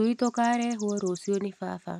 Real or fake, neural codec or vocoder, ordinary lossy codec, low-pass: real; none; none; 14.4 kHz